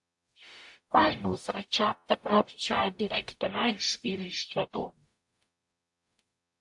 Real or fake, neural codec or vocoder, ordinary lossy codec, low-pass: fake; codec, 44.1 kHz, 0.9 kbps, DAC; AAC, 64 kbps; 10.8 kHz